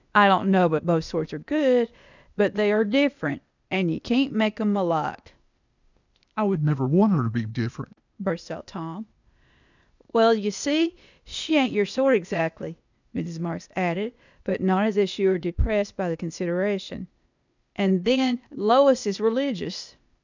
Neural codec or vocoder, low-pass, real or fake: codec, 16 kHz, 0.8 kbps, ZipCodec; 7.2 kHz; fake